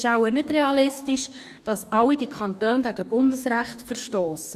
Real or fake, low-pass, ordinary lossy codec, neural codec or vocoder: fake; 14.4 kHz; none; codec, 44.1 kHz, 2.6 kbps, DAC